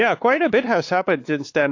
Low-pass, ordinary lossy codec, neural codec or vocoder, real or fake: 7.2 kHz; AAC, 48 kbps; codec, 16 kHz, 4 kbps, X-Codec, WavLM features, trained on Multilingual LibriSpeech; fake